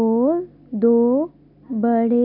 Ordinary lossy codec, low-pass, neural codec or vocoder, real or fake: none; 5.4 kHz; none; real